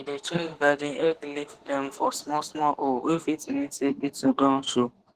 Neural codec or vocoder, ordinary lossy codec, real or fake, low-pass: autoencoder, 48 kHz, 32 numbers a frame, DAC-VAE, trained on Japanese speech; Opus, 16 kbps; fake; 14.4 kHz